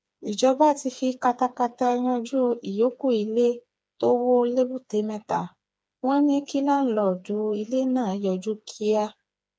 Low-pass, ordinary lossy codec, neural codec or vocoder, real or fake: none; none; codec, 16 kHz, 4 kbps, FreqCodec, smaller model; fake